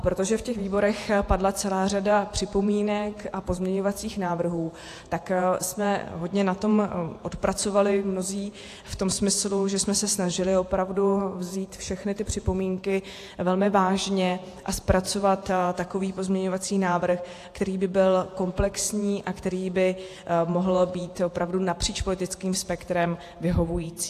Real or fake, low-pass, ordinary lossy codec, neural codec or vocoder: fake; 14.4 kHz; AAC, 64 kbps; vocoder, 48 kHz, 128 mel bands, Vocos